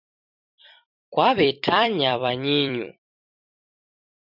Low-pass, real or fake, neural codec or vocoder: 5.4 kHz; fake; vocoder, 44.1 kHz, 128 mel bands every 256 samples, BigVGAN v2